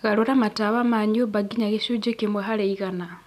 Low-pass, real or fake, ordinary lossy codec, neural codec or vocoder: 14.4 kHz; real; none; none